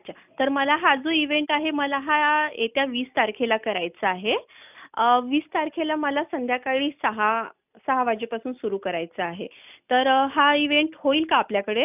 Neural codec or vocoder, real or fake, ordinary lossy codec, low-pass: none; real; none; 3.6 kHz